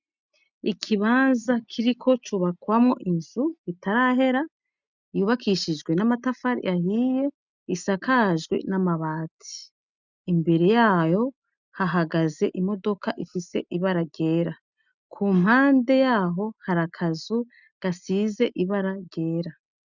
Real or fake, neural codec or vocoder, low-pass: real; none; 7.2 kHz